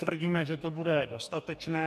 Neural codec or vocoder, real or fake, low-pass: codec, 44.1 kHz, 2.6 kbps, DAC; fake; 14.4 kHz